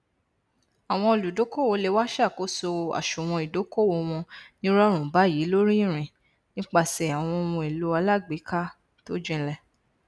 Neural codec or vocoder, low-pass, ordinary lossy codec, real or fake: none; none; none; real